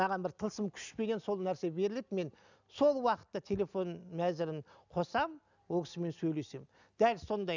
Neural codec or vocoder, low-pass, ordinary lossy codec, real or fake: none; 7.2 kHz; none; real